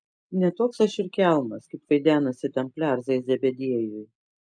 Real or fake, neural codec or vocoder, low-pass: real; none; 9.9 kHz